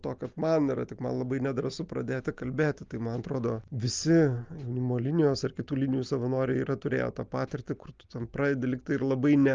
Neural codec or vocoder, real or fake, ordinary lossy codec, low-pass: none; real; Opus, 24 kbps; 7.2 kHz